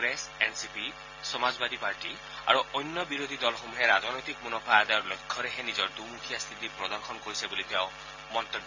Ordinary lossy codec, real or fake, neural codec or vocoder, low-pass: AAC, 48 kbps; real; none; 7.2 kHz